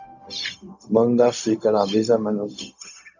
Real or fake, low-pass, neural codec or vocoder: fake; 7.2 kHz; codec, 16 kHz, 0.4 kbps, LongCat-Audio-Codec